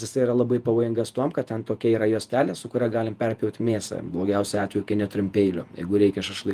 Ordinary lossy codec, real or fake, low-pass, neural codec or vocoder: Opus, 32 kbps; fake; 14.4 kHz; vocoder, 48 kHz, 128 mel bands, Vocos